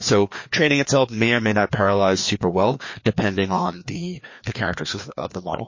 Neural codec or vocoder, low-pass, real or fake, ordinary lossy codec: codec, 16 kHz, 2 kbps, FreqCodec, larger model; 7.2 kHz; fake; MP3, 32 kbps